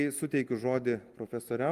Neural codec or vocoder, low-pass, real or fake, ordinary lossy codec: none; 14.4 kHz; real; Opus, 32 kbps